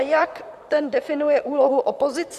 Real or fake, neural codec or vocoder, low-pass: fake; vocoder, 44.1 kHz, 128 mel bands, Pupu-Vocoder; 14.4 kHz